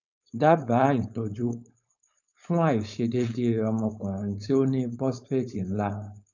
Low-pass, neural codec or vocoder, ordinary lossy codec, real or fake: 7.2 kHz; codec, 16 kHz, 4.8 kbps, FACodec; none; fake